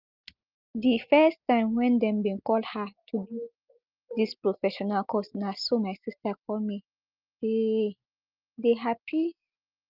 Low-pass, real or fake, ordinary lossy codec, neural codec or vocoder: 5.4 kHz; real; Opus, 24 kbps; none